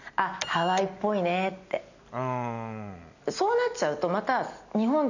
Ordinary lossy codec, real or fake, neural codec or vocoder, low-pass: none; real; none; 7.2 kHz